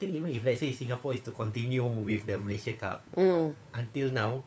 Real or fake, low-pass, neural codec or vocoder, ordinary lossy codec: fake; none; codec, 16 kHz, 4 kbps, FunCodec, trained on LibriTTS, 50 frames a second; none